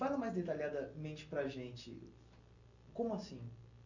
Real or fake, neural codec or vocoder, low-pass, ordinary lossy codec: real; none; 7.2 kHz; none